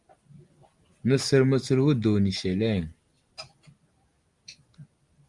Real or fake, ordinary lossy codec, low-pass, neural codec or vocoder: real; Opus, 32 kbps; 10.8 kHz; none